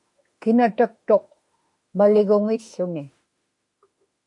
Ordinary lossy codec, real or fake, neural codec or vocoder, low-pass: MP3, 48 kbps; fake; autoencoder, 48 kHz, 32 numbers a frame, DAC-VAE, trained on Japanese speech; 10.8 kHz